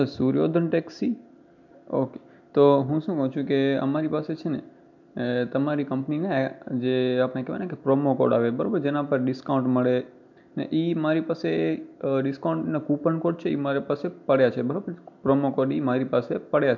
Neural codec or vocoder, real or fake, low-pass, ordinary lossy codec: none; real; 7.2 kHz; none